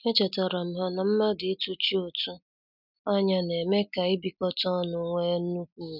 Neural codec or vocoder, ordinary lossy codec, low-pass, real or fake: none; none; 5.4 kHz; real